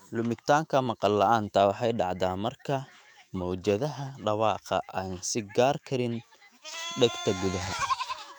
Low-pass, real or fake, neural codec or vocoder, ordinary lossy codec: 19.8 kHz; fake; autoencoder, 48 kHz, 128 numbers a frame, DAC-VAE, trained on Japanese speech; none